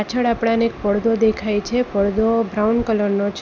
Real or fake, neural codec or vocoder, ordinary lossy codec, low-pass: real; none; none; none